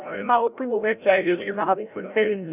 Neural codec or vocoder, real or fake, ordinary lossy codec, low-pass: codec, 16 kHz, 0.5 kbps, FreqCodec, larger model; fake; none; 3.6 kHz